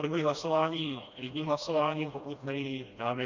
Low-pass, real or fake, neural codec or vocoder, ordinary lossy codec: 7.2 kHz; fake; codec, 16 kHz, 1 kbps, FreqCodec, smaller model; Opus, 64 kbps